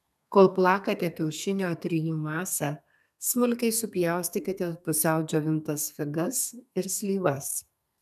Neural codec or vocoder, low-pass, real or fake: codec, 32 kHz, 1.9 kbps, SNAC; 14.4 kHz; fake